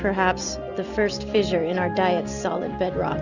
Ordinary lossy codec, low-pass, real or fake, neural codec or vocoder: Opus, 64 kbps; 7.2 kHz; real; none